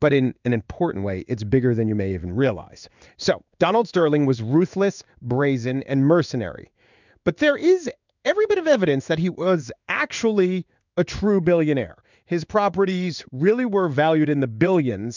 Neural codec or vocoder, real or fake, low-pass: codec, 16 kHz in and 24 kHz out, 1 kbps, XY-Tokenizer; fake; 7.2 kHz